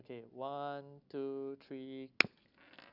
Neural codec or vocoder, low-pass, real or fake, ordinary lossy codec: none; 5.4 kHz; real; none